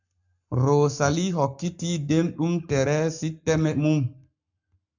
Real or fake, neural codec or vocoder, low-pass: fake; codec, 44.1 kHz, 7.8 kbps, Pupu-Codec; 7.2 kHz